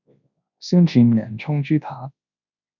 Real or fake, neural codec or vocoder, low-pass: fake; codec, 24 kHz, 0.9 kbps, WavTokenizer, large speech release; 7.2 kHz